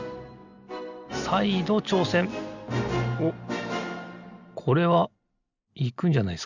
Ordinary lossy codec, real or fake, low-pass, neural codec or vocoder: none; real; 7.2 kHz; none